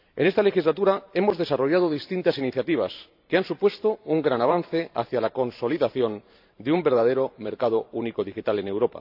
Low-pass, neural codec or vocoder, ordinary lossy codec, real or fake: 5.4 kHz; vocoder, 44.1 kHz, 128 mel bands every 512 samples, BigVGAN v2; AAC, 48 kbps; fake